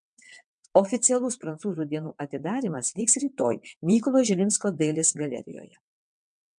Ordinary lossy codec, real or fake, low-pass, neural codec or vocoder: MP3, 64 kbps; fake; 9.9 kHz; vocoder, 22.05 kHz, 80 mel bands, WaveNeXt